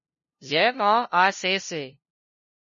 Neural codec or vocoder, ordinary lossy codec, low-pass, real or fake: codec, 16 kHz, 0.5 kbps, FunCodec, trained on LibriTTS, 25 frames a second; MP3, 32 kbps; 7.2 kHz; fake